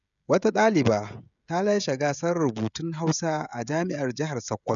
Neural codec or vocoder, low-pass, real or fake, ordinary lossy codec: codec, 16 kHz, 16 kbps, FreqCodec, smaller model; 7.2 kHz; fake; none